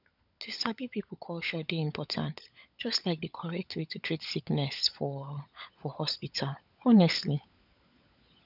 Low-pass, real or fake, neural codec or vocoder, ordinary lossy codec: 5.4 kHz; fake; codec, 16 kHz, 16 kbps, FunCodec, trained on LibriTTS, 50 frames a second; none